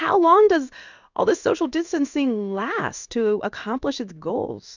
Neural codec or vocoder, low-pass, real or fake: codec, 16 kHz in and 24 kHz out, 1 kbps, XY-Tokenizer; 7.2 kHz; fake